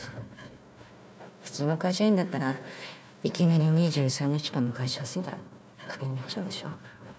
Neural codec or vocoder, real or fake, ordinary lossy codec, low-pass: codec, 16 kHz, 1 kbps, FunCodec, trained on Chinese and English, 50 frames a second; fake; none; none